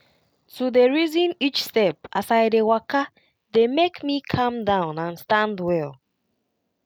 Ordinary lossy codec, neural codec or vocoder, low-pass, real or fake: none; none; 19.8 kHz; real